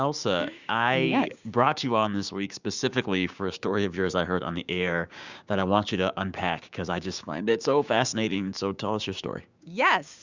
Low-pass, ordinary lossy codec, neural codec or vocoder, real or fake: 7.2 kHz; Opus, 64 kbps; codec, 16 kHz, 6 kbps, DAC; fake